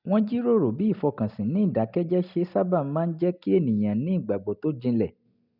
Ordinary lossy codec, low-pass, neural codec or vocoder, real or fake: none; 5.4 kHz; none; real